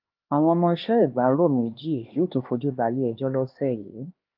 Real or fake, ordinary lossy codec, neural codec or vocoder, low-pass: fake; Opus, 32 kbps; codec, 16 kHz, 2 kbps, X-Codec, HuBERT features, trained on LibriSpeech; 5.4 kHz